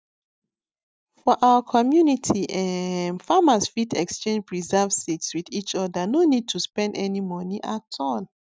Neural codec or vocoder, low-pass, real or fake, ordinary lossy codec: none; none; real; none